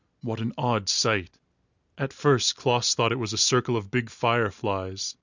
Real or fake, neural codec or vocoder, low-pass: real; none; 7.2 kHz